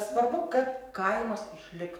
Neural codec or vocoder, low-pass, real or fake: codec, 44.1 kHz, 7.8 kbps, DAC; 19.8 kHz; fake